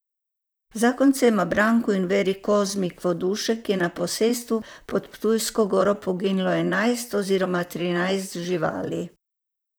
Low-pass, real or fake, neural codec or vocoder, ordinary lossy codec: none; fake; vocoder, 44.1 kHz, 128 mel bands, Pupu-Vocoder; none